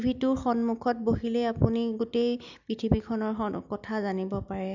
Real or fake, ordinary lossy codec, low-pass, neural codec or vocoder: real; none; 7.2 kHz; none